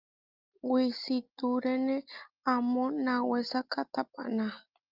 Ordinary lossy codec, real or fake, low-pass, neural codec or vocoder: Opus, 32 kbps; real; 5.4 kHz; none